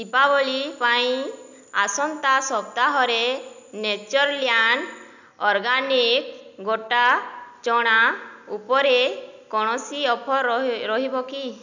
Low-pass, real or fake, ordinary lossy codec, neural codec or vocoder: 7.2 kHz; real; none; none